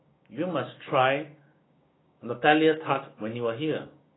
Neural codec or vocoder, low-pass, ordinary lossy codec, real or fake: codec, 16 kHz, 6 kbps, DAC; 7.2 kHz; AAC, 16 kbps; fake